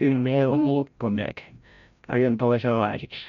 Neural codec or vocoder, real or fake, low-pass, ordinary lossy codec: codec, 16 kHz, 0.5 kbps, FreqCodec, larger model; fake; 7.2 kHz; none